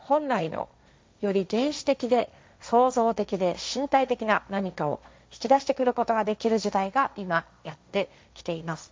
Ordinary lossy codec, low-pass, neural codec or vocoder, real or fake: none; none; codec, 16 kHz, 1.1 kbps, Voila-Tokenizer; fake